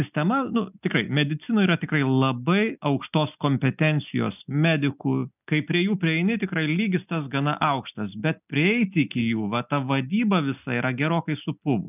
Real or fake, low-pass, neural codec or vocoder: real; 3.6 kHz; none